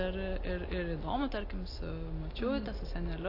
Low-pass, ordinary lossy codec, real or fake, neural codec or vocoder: 5.4 kHz; AAC, 32 kbps; real; none